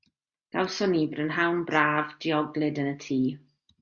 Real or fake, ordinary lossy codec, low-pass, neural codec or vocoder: real; Opus, 64 kbps; 7.2 kHz; none